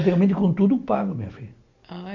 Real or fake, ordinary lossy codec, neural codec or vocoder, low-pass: real; none; none; 7.2 kHz